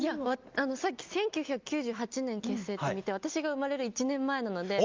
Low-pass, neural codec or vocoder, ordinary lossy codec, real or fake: 7.2 kHz; none; Opus, 24 kbps; real